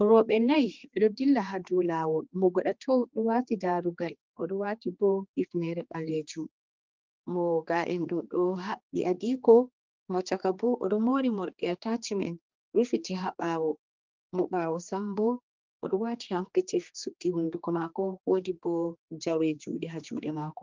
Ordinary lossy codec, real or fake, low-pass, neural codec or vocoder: Opus, 24 kbps; fake; 7.2 kHz; codec, 16 kHz, 2 kbps, X-Codec, HuBERT features, trained on general audio